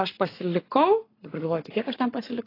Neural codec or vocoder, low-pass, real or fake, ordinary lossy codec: codec, 44.1 kHz, 7.8 kbps, Pupu-Codec; 5.4 kHz; fake; AAC, 24 kbps